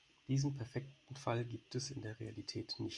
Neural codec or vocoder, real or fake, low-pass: none; real; 10.8 kHz